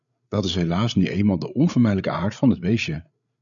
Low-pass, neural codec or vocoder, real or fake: 7.2 kHz; codec, 16 kHz, 8 kbps, FreqCodec, larger model; fake